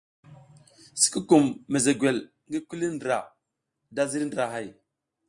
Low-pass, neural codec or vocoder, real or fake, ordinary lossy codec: 10.8 kHz; none; real; Opus, 64 kbps